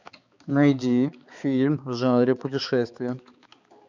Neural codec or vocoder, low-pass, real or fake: codec, 16 kHz, 4 kbps, X-Codec, HuBERT features, trained on balanced general audio; 7.2 kHz; fake